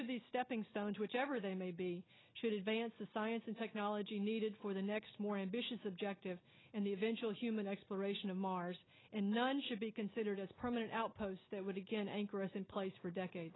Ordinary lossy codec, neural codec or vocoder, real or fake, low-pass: AAC, 16 kbps; none; real; 7.2 kHz